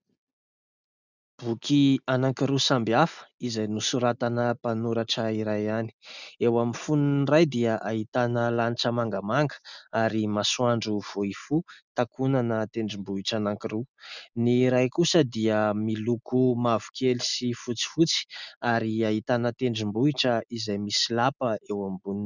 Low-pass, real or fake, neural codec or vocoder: 7.2 kHz; real; none